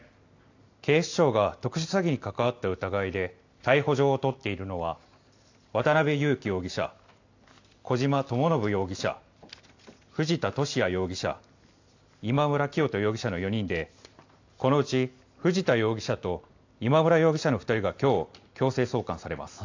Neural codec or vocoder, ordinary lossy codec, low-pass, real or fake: none; AAC, 48 kbps; 7.2 kHz; real